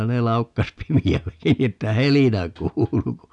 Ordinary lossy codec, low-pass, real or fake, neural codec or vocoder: none; 10.8 kHz; real; none